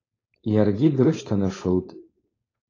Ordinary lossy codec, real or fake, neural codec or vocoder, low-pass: AAC, 32 kbps; fake; codec, 16 kHz, 4.8 kbps, FACodec; 7.2 kHz